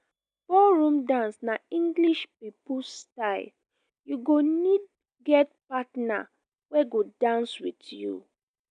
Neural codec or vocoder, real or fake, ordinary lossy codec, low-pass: none; real; none; 9.9 kHz